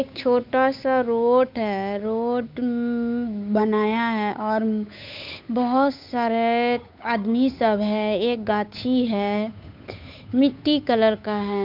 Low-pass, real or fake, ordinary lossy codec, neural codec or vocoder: 5.4 kHz; fake; MP3, 48 kbps; codec, 16 kHz, 8 kbps, FunCodec, trained on Chinese and English, 25 frames a second